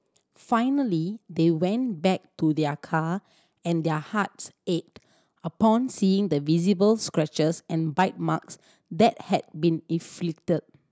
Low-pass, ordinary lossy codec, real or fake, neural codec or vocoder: none; none; real; none